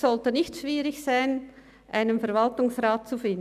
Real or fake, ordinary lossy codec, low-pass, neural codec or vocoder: real; none; 14.4 kHz; none